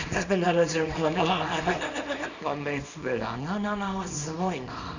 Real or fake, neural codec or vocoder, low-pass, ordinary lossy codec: fake; codec, 24 kHz, 0.9 kbps, WavTokenizer, small release; 7.2 kHz; none